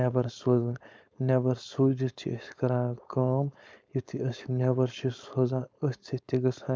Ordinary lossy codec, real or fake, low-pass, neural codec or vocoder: none; fake; none; codec, 16 kHz, 4.8 kbps, FACodec